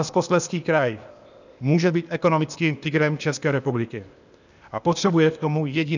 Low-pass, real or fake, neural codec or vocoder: 7.2 kHz; fake; codec, 16 kHz, 0.8 kbps, ZipCodec